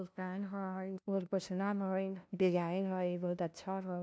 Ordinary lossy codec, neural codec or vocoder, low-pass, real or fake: none; codec, 16 kHz, 0.5 kbps, FunCodec, trained on LibriTTS, 25 frames a second; none; fake